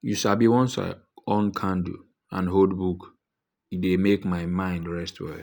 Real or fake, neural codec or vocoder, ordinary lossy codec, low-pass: real; none; none; none